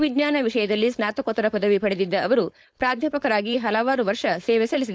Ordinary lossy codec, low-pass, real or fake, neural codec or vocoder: none; none; fake; codec, 16 kHz, 4.8 kbps, FACodec